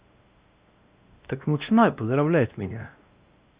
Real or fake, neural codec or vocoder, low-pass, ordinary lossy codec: fake; codec, 16 kHz, 1 kbps, X-Codec, WavLM features, trained on Multilingual LibriSpeech; 3.6 kHz; Opus, 64 kbps